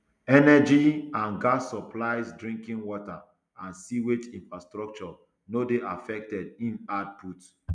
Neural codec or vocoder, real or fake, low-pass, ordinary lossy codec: none; real; 9.9 kHz; none